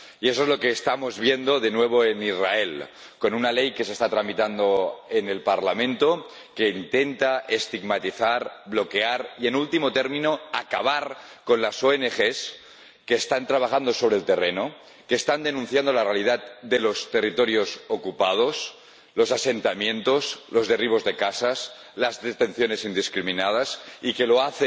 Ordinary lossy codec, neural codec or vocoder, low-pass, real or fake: none; none; none; real